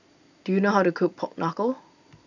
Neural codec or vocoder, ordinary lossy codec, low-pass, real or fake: none; none; 7.2 kHz; real